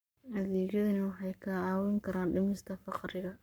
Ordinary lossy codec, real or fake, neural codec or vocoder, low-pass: none; fake; codec, 44.1 kHz, 7.8 kbps, Pupu-Codec; none